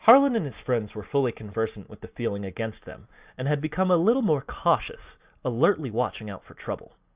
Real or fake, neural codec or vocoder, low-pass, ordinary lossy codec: real; none; 3.6 kHz; Opus, 64 kbps